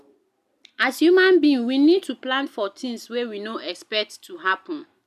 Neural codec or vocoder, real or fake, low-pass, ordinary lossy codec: none; real; 14.4 kHz; none